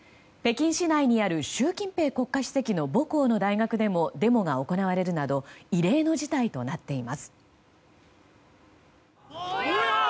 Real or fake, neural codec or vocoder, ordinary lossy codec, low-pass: real; none; none; none